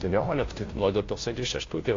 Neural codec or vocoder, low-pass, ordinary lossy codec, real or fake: codec, 16 kHz, 0.5 kbps, FunCodec, trained on Chinese and English, 25 frames a second; 7.2 kHz; AAC, 32 kbps; fake